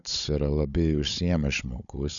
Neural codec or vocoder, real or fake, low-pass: codec, 16 kHz, 16 kbps, FunCodec, trained on Chinese and English, 50 frames a second; fake; 7.2 kHz